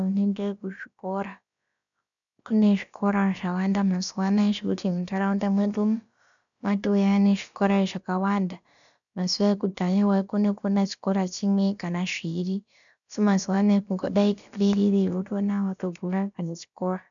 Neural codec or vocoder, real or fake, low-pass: codec, 16 kHz, about 1 kbps, DyCAST, with the encoder's durations; fake; 7.2 kHz